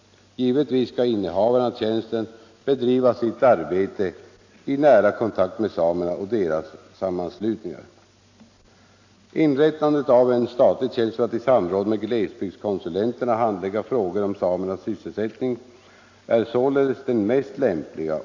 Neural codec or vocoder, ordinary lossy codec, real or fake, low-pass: none; AAC, 48 kbps; real; 7.2 kHz